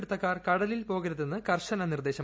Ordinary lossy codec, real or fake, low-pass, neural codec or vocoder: none; real; none; none